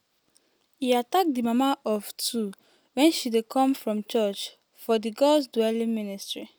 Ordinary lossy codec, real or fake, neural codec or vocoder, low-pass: none; real; none; none